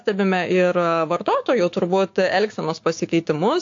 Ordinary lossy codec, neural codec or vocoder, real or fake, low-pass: AAC, 48 kbps; codec, 16 kHz, 6 kbps, DAC; fake; 7.2 kHz